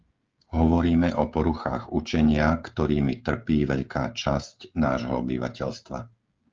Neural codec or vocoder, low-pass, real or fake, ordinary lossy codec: codec, 16 kHz, 16 kbps, FreqCodec, smaller model; 7.2 kHz; fake; Opus, 32 kbps